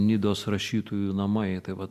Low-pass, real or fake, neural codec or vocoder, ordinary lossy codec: 14.4 kHz; real; none; Opus, 64 kbps